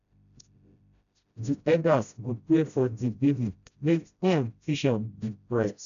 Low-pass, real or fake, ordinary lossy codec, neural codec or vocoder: 7.2 kHz; fake; none; codec, 16 kHz, 0.5 kbps, FreqCodec, smaller model